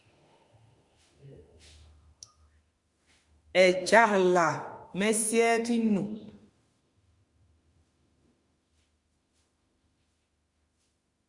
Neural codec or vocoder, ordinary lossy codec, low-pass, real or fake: autoencoder, 48 kHz, 32 numbers a frame, DAC-VAE, trained on Japanese speech; Opus, 64 kbps; 10.8 kHz; fake